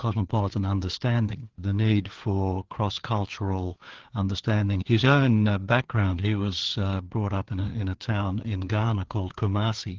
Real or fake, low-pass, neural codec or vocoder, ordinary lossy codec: fake; 7.2 kHz; codec, 16 kHz, 4 kbps, FreqCodec, larger model; Opus, 16 kbps